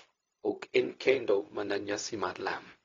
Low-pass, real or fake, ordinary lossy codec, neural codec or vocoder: 7.2 kHz; fake; AAC, 32 kbps; codec, 16 kHz, 0.4 kbps, LongCat-Audio-Codec